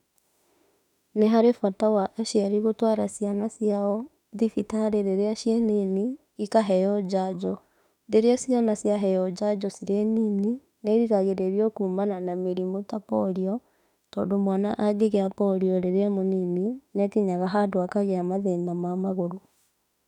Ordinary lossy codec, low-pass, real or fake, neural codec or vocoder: none; 19.8 kHz; fake; autoencoder, 48 kHz, 32 numbers a frame, DAC-VAE, trained on Japanese speech